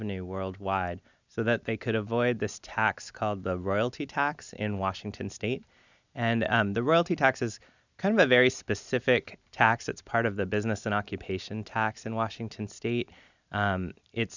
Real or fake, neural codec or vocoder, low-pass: real; none; 7.2 kHz